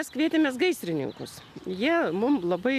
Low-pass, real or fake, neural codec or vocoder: 14.4 kHz; real; none